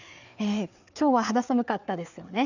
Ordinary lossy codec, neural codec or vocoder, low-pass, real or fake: none; codec, 16 kHz, 4 kbps, FreqCodec, larger model; 7.2 kHz; fake